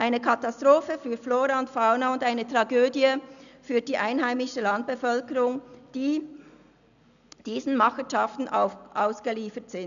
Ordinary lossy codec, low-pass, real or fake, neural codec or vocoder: none; 7.2 kHz; real; none